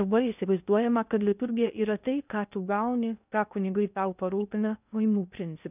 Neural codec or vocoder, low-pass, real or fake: codec, 16 kHz in and 24 kHz out, 0.6 kbps, FocalCodec, streaming, 2048 codes; 3.6 kHz; fake